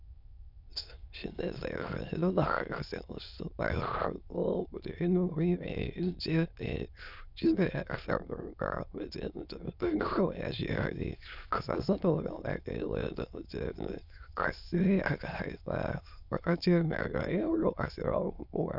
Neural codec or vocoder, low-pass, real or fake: autoencoder, 22.05 kHz, a latent of 192 numbers a frame, VITS, trained on many speakers; 5.4 kHz; fake